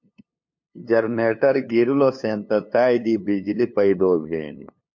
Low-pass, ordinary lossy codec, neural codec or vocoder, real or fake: 7.2 kHz; MP3, 48 kbps; codec, 16 kHz, 2 kbps, FunCodec, trained on LibriTTS, 25 frames a second; fake